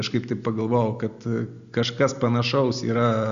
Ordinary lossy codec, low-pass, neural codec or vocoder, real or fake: Opus, 64 kbps; 7.2 kHz; none; real